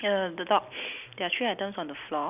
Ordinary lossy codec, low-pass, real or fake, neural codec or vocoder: none; 3.6 kHz; real; none